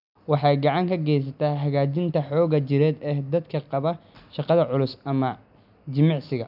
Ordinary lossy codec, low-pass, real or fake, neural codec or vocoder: none; 5.4 kHz; real; none